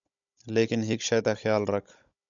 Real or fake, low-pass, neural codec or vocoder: fake; 7.2 kHz; codec, 16 kHz, 16 kbps, FunCodec, trained on Chinese and English, 50 frames a second